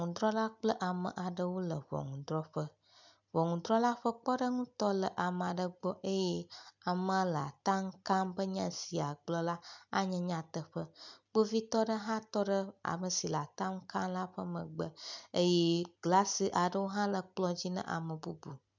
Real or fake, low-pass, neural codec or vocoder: real; 7.2 kHz; none